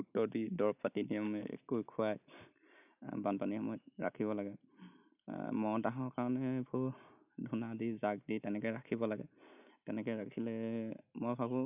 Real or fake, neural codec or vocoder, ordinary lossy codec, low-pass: real; none; none; 3.6 kHz